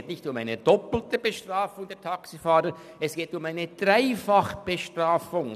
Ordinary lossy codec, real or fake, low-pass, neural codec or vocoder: none; real; 14.4 kHz; none